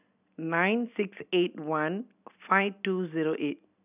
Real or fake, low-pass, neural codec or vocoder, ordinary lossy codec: real; 3.6 kHz; none; none